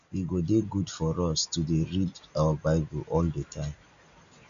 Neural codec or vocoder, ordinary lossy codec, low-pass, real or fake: none; none; 7.2 kHz; real